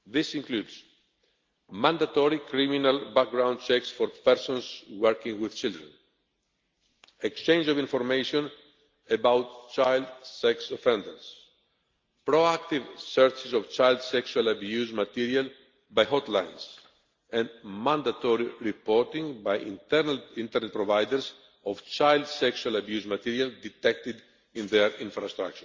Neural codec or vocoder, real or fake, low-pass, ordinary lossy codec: none; real; 7.2 kHz; Opus, 32 kbps